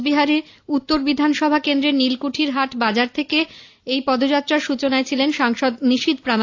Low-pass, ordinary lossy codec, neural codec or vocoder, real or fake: 7.2 kHz; AAC, 48 kbps; none; real